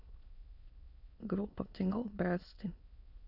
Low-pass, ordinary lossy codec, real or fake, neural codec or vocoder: 5.4 kHz; none; fake; autoencoder, 22.05 kHz, a latent of 192 numbers a frame, VITS, trained on many speakers